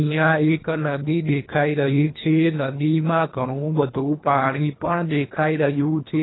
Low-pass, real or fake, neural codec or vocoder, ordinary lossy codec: 7.2 kHz; fake; codec, 24 kHz, 1.5 kbps, HILCodec; AAC, 16 kbps